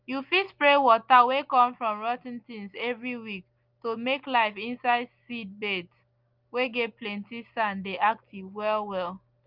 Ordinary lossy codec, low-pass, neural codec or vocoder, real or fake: Opus, 32 kbps; 5.4 kHz; none; real